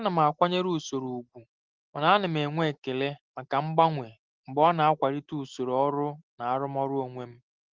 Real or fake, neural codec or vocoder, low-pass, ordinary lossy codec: real; none; 7.2 kHz; Opus, 16 kbps